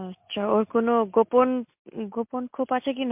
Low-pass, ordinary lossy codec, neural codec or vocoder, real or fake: 3.6 kHz; MP3, 32 kbps; none; real